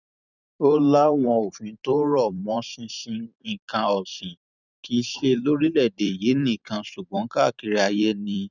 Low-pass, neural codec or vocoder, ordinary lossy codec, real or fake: 7.2 kHz; vocoder, 44.1 kHz, 128 mel bands every 512 samples, BigVGAN v2; none; fake